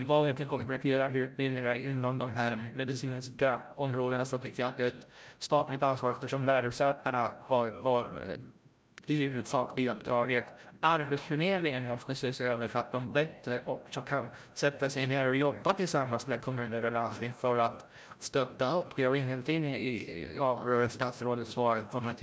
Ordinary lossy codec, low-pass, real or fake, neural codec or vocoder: none; none; fake; codec, 16 kHz, 0.5 kbps, FreqCodec, larger model